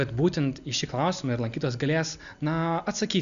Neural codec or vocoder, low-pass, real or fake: none; 7.2 kHz; real